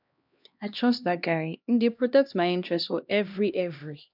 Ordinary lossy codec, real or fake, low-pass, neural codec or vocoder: none; fake; 5.4 kHz; codec, 16 kHz, 1 kbps, X-Codec, HuBERT features, trained on LibriSpeech